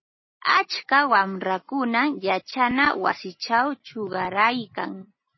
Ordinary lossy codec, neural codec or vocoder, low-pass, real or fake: MP3, 24 kbps; none; 7.2 kHz; real